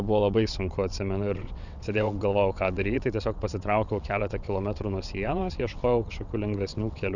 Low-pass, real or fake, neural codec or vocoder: 7.2 kHz; fake; vocoder, 22.05 kHz, 80 mel bands, WaveNeXt